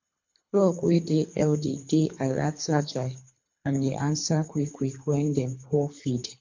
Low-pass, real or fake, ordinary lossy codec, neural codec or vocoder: 7.2 kHz; fake; MP3, 48 kbps; codec, 24 kHz, 3 kbps, HILCodec